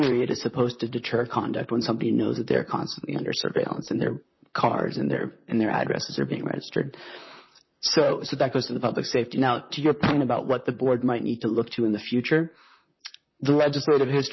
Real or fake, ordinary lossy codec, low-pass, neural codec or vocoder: fake; MP3, 24 kbps; 7.2 kHz; vocoder, 44.1 kHz, 128 mel bands, Pupu-Vocoder